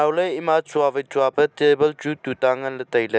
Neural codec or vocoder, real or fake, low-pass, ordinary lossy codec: none; real; none; none